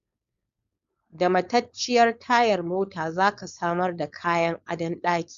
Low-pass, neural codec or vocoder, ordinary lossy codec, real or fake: 7.2 kHz; codec, 16 kHz, 4.8 kbps, FACodec; Opus, 64 kbps; fake